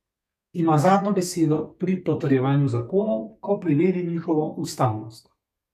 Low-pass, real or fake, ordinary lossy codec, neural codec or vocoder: 14.4 kHz; fake; none; codec, 32 kHz, 1.9 kbps, SNAC